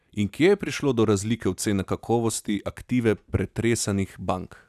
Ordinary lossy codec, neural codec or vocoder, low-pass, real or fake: none; none; 14.4 kHz; real